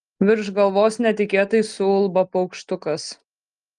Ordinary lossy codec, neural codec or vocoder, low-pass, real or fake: Opus, 24 kbps; none; 9.9 kHz; real